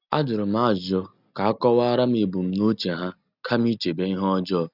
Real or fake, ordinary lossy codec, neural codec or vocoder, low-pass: real; none; none; 5.4 kHz